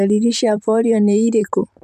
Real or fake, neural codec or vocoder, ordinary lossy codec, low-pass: fake; vocoder, 44.1 kHz, 128 mel bands, Pupu-Vocoder; none; 14.4 kHz